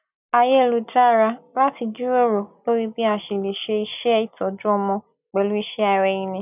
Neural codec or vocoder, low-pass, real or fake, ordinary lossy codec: none; 3.6 kHz; real; none